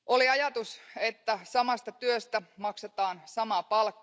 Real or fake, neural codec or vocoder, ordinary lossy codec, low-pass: real; none; none; none